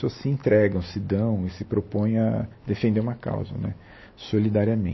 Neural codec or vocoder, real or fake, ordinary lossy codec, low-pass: none; real; MP3, 24 kbps; 7.2 kHz